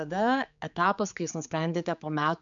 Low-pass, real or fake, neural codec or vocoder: 7.2 kHz; fake; codec, 16 kHz, 4 kbps, X-Codec, HuBERT features, trained on general audio